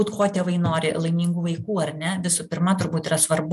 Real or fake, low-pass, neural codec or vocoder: real; 14.4 kHz; none